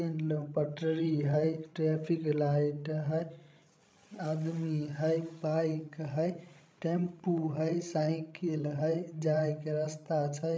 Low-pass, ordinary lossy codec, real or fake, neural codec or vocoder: none; none; fake; codec, 16 kHz, 16 kbps, FreqCodec, larger model